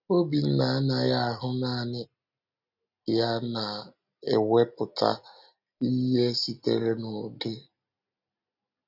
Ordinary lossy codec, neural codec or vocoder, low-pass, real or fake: none; none; 5.4 kHz; real